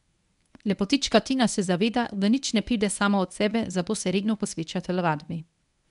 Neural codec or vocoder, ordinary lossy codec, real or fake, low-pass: codec, 24 kHz, 0.9 kbps, WavTokenizer, medium speech release version 1; none; fake; 10.8 kHz